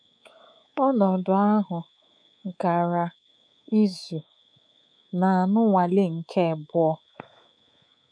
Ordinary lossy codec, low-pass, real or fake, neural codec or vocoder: none; 9.9 kHz; fake; codec, 24 kHz, 3.1 kbps, DualCodec